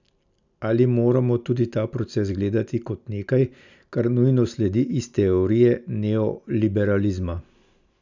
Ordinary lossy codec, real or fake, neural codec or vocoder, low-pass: none; real; none; 7.2 kHz